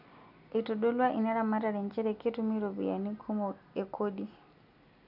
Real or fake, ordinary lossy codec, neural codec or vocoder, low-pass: real; none; none; 5.4 kHz